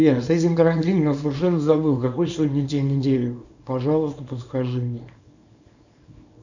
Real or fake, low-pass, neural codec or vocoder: fake; 7.2 kHz; codec, 24 kHz, 0.9 kbps, WavTokenizer, small release